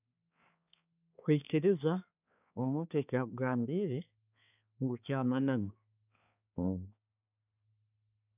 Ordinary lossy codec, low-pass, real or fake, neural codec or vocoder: none; 3.6 kHz; fake; codec, 16 kHz, 4 kbps, X-Codec, HuBERT features, trained on balanced general audio